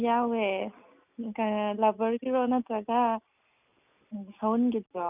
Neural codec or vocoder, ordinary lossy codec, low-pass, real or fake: none; none; 3.6 kHz; real